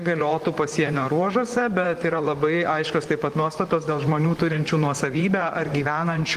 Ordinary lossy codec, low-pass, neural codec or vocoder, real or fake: Opus, 24 kbps; 14.4 kHz; vocoder, 44.1 kHz, 128 mel bands, Pupu-Vocoder; fake